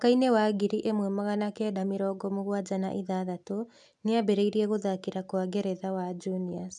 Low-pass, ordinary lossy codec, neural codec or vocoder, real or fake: 10.8 kHz; none; none; real